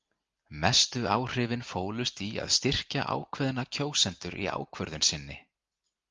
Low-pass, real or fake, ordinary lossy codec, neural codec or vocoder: 7.2 kHz; real; Opus, 32 kbps; none